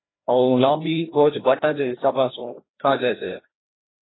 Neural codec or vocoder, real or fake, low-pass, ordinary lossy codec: codec, 16 kHz, 1 kbps, FreqCodec, larger model; fake; 7.2 kHz; AAC, 16 kbps